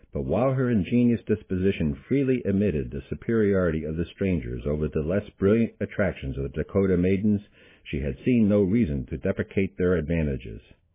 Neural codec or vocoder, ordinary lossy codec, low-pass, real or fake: none; MP3, 16 kbps; 3.6 kHz; real